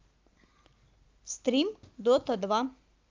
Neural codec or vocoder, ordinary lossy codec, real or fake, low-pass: none; Opus, 32 kbps; real; 7.2 kHz